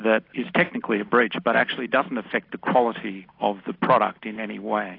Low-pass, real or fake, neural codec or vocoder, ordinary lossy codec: 5.4 kHz; real; none; AAC, 32 kbps